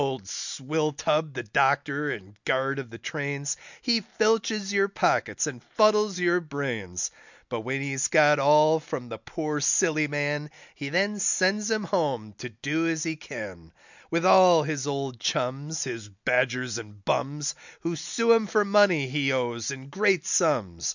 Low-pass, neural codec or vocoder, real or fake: 7.2 kHz; none; real